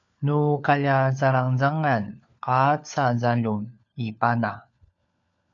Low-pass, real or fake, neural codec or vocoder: 7.2 kHz; fake; codec, 16 kHz, 4 kbps, FunCodec, trained on LibriTTS, 50 frames a second